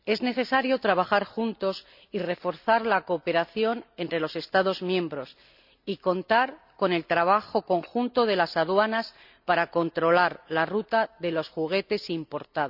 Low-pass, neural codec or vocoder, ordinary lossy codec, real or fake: 5.4 kHz; none; none; real